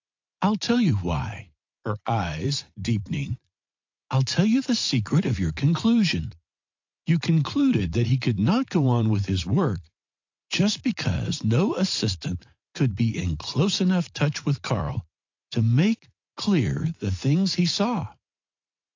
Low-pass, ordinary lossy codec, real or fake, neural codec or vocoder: 7.2 kHz; AAC, 48 kbps; fake; vocoder, 44.1 kHz, 80 mel bands, Vocos